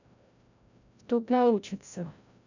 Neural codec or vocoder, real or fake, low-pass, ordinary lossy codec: codec, 16 kHz, 0.5 kbps, FreqCodec, larger model; fake; 7.2 kHz; none